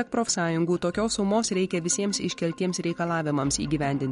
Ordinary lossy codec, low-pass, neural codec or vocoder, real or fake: MP3, 48 kbps; 19.8 kHz; none; real